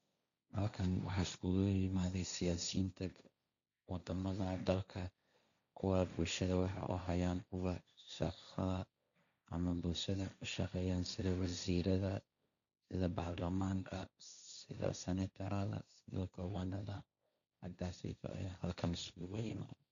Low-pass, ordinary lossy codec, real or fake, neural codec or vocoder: 7.2 kHz; none; fake; codec, 16 kHz, 1.1 kbps, Voila-Tokenizer